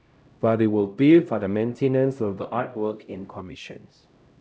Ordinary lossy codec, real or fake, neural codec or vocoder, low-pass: none; fake; codec, 16 kHz, 0.5 kbps, X-Codec, HuBERT features, trained on LibriSpeech; none